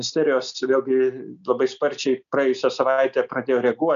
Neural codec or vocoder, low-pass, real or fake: none; 7.2 kHz; real